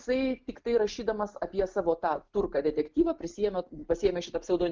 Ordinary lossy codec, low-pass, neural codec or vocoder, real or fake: Opus, 32 kbps; 7.2 kHz; none; real